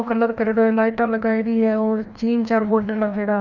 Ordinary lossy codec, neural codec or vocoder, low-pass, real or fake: none; codec, 16 kHz, 1 kbps, FreqCodec, larger model; 7.2 kHz; fake